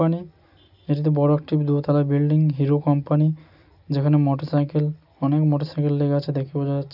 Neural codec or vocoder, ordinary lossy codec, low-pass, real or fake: none; none; 5.4 kHz; real